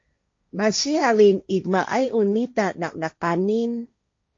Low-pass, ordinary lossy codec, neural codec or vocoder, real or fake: 7.2 kHz; AAC, 48 kbps; codec, 16 kHz, 1.1 kbps, Voila-Tokenizer; fake